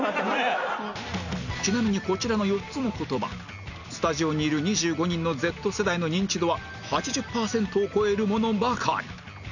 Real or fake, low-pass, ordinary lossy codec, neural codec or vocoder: real; 7.2 kHz; none; none